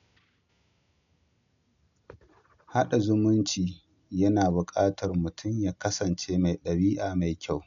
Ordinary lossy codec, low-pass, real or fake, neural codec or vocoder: none; 7.2 kHz; real; none